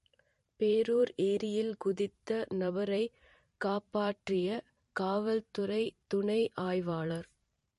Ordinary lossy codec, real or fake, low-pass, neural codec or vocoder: MP3, 48 kbps; fake; 14.4 kHz; vocoder, 44.1 kHz, 128 mel bands every 512 samples, BigVGAN v2